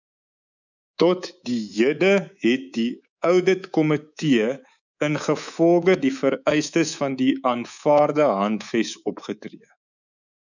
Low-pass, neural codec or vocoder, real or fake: 7.2 kHz; codec, 24 kHz, 3.1 kbps, DualCodec; fake